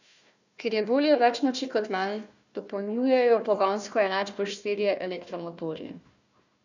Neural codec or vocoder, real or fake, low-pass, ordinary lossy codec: codec, 16 kHz, 1 kbps, FunCodec, trained on Chinese and English, 50 frames a second; fake; 7.2 kHz; none